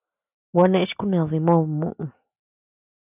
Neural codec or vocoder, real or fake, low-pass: none; real; 3.6 kHz